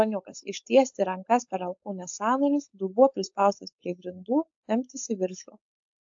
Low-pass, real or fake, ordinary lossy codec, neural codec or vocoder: 7.2 kHz; fake; AAC, 64 kbps; codec, 16 kHz, 4.8 kbps, FACodec